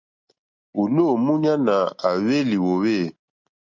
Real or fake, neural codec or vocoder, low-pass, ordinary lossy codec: real; none; 7.2 kHz; MP3, 48 kbps